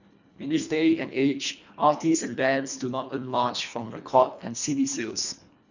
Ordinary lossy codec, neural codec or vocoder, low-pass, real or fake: none; codec, 24 kHz, 1.5 kbps, HILCodec; 7.2 kHz; fake